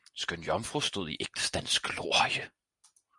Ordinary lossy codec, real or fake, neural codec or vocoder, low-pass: MP3, 96 kbps; real; none; 10.8 kHz